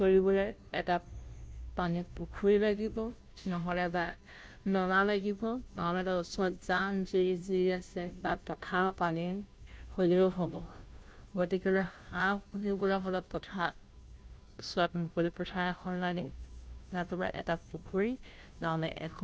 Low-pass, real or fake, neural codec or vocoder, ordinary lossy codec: none; fake; codec, 16 kHz, 0.5 kbps, FunCodec, trained on Chinese and English, 25 frames a second; none